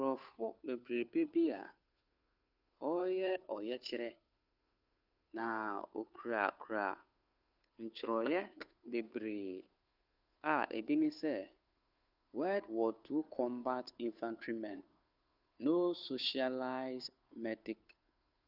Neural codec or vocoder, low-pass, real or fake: codec, 16 kHz, 2 kbps, FunCodec, trained on Chinese and English, 25 frames a second; 5.4 kHz; fake